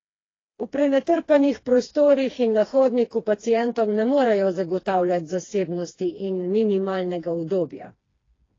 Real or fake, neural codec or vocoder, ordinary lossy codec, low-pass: fake; codec, 16 kHz, 2 kbps, FreqCodec, smaller model; AAC, 32 kbps; 7.2 kHz